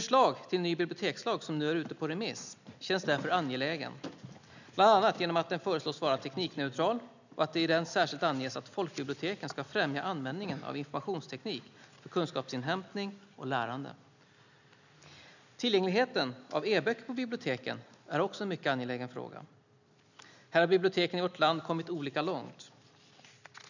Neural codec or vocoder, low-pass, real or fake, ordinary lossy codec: none; 7.2 kHz; real; none